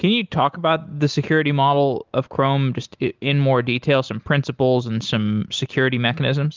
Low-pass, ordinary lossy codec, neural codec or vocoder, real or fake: 7.2 kHz; Opus, 32 kbps; none; real